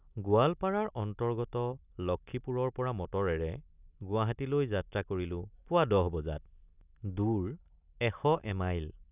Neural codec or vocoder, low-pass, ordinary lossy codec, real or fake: none; 3.6 kHz; none; real